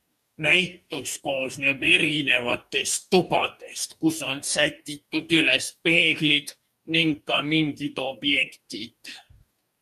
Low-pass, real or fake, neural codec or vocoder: 14.4 kHz; fake; codec, 44.1 kHz, 2.6 kbps, DAC